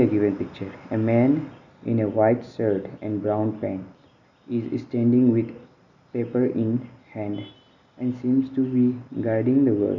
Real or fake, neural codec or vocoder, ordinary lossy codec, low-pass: real; none; none; 7.2 kHz